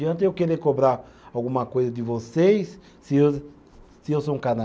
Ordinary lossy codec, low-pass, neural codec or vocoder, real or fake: none; none; none; real